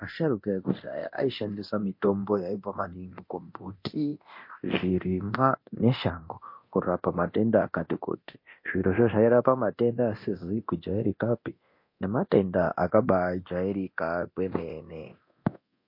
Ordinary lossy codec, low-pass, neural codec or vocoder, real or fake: MP3, 32 kbps; 5.4 kHz; codec, 24 kHz, 0.9 kbps, DualCodec; fake